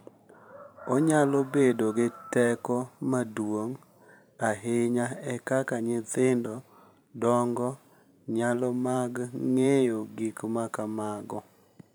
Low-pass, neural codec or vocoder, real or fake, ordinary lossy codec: none; vocoder, 44.1 kHz, 128 mel bands every 512 samples, BigVGAN v2; fake; none